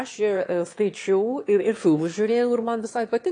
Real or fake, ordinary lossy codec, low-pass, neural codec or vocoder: fake; AAC, 48 kbps; 9.9 kHz; autoencoder, 22.05 kHz, a latent of 192 numbers a frame, VITS, trained on one speaker